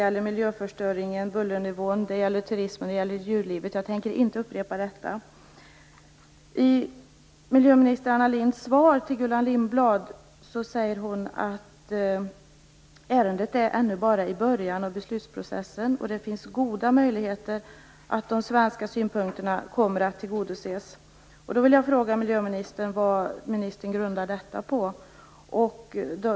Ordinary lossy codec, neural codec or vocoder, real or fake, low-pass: none; none; real; none